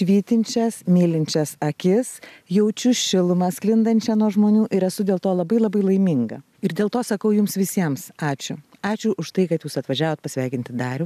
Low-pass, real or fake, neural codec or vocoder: 14.4 kHz; real; none